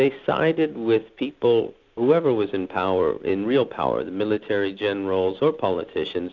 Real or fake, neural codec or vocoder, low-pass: real; none; 7.2 kHz